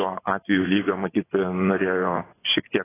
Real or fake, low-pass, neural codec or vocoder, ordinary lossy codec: fake; 3.6 kHz; vocoder, 24 kHz, 100 mel bands, Vocos; AAC, 16 kbps